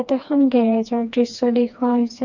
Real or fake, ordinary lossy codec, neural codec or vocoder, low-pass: fake; none; codec, 16 kHz, 2 kbps, FreqCodec, smaller model; 7.2 kHz